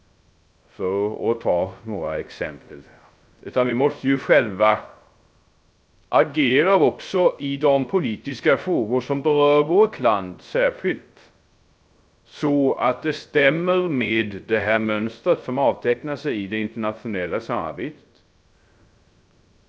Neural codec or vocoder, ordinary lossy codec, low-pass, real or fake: codec, 16 kHz, 0.3 kbps, FocalCodec; none; none; fake